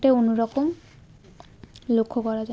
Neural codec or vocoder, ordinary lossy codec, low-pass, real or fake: none; none; none; real